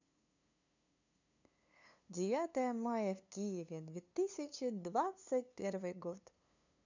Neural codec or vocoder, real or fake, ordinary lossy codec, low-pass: codec, 16 kHz, 2 kbps, FunCodec, trained on LibriTTS, 25 frames a second; fake; none; 7.2 kHz